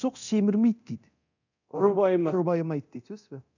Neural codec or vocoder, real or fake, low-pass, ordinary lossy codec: codec, 24 kHz, 0.9 kbps, DualCodec; fake; 7.2 kHz; none